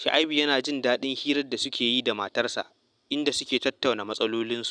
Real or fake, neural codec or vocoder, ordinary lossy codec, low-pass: real; none; none; 9.9 kHz